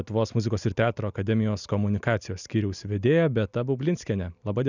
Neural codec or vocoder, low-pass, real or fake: none; 7.2 kHz; real